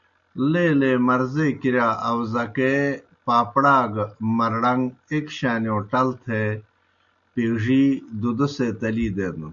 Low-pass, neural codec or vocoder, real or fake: 7.2 kHz; none; real